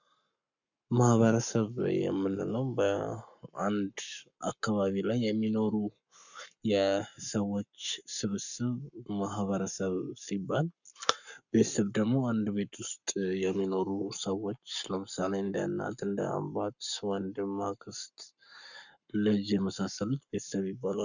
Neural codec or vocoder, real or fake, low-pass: codec, 44.1 kHz, 7.8 kbps, Pupu-Codec; fake; 7.2 kHz